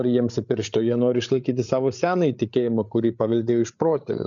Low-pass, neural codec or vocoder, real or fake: 7.2 kHz; codec, 16 kHz, 8 kbps, FreqCodec, larger model; fake